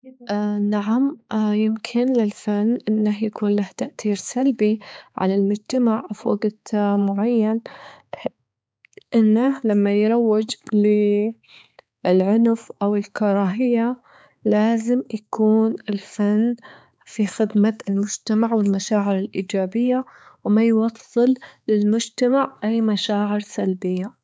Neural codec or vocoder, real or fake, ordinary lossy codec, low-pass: codec, 16 kHz, 4 kbps, X-Codec, HuBERT features, trained on balanced general audio; fake; none; none